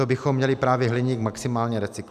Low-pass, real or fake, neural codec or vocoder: 14.4 kHz; real; none